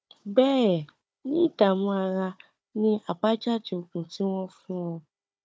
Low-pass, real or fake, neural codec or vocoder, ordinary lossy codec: none; fake; codec, 16 kHz, 4 kbps, FunCodec, trained on Chinese and English, 50 frames a second; none